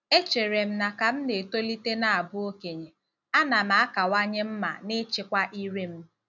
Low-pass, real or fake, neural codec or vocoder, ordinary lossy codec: 7.2 kHz; real; none; none